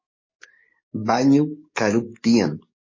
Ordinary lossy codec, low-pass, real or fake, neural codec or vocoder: MP3, 32 kbps; 7.2 kHz; fake; codec, 44.1 kHz, 7.8 kbps, DAC